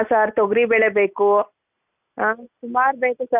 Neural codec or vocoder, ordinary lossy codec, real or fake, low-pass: none; none; real; 3.6 kHz